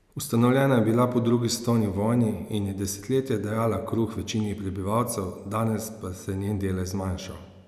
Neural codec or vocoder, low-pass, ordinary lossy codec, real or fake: none; 14.4 kHz; none; real